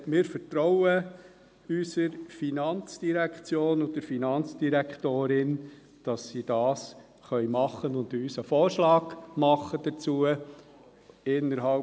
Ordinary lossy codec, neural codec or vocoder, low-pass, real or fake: none; none; none; real